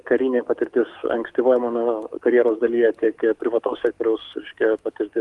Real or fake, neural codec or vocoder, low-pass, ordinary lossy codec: fake; codec, 44.1 kHz, 7.8 kbps, DAC; 10.8 kHz; Opus, 24 kbps